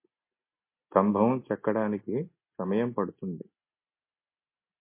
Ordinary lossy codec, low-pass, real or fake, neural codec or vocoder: MP3, 24 kbps; 3.6 kHz; real; none